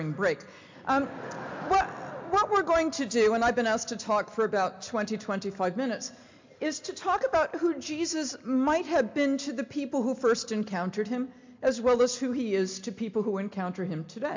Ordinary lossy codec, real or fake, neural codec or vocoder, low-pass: MP3, 64 kbps; real; none; 7.2 kHz